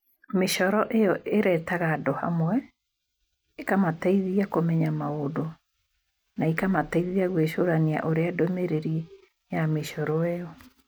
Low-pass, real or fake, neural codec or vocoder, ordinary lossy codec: none; real; none; none